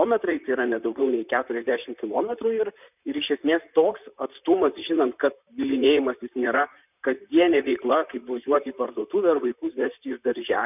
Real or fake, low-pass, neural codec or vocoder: fake; 3.6 kHz; vocoder, 44.1 kHz, 128 mel bands, Pupu-Vocoder